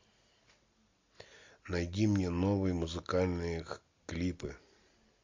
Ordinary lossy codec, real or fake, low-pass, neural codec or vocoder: MP3, 48 kbps; real; 7.2 kHz; none